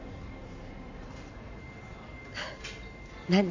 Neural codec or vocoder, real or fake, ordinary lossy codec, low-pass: none; real; MP3, 64 kbps; 7.2 kHz